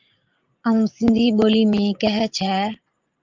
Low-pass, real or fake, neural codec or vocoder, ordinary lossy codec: 7.2 kHz; fake; vocoder, 44.1 kHz, 80 mel bands, Vocos; Opus, 24 kbps